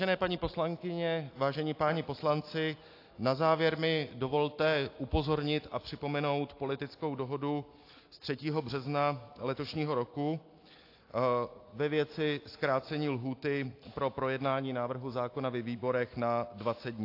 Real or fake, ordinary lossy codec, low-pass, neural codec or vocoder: real; AAC, 32 kbps; 5.4 kHz; none